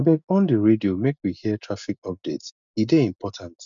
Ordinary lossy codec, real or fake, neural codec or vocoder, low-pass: none; real; none; 7.2 kHz